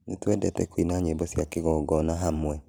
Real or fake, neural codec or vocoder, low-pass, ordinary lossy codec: fake; vocoder, 44.1 kHz, 128 mel bands every 512 samples, BigVGAN v2; none; none